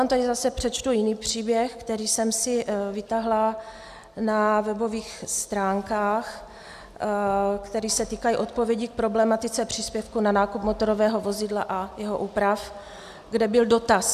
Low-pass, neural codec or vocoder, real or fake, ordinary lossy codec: 14.4 kHz; none; real; AAC, 96 kbps